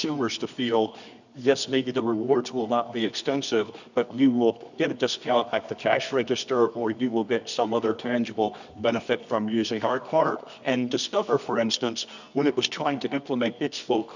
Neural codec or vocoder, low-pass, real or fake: codec, 24 kHz, 0.9 kbps, WavTokenizer, medium music audio release; 7.2 kHz; fake